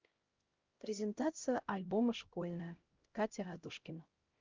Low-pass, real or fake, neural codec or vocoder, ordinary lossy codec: 7.2 kHz; fake; codec, 16 kHz, 0.5 kbps, X-Codec, HuBERT features, trained on LibriSpeech; Opus, 32 kbps